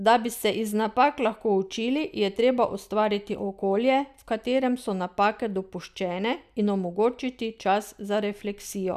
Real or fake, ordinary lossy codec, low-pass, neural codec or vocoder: real; none; 14.4 kHz; none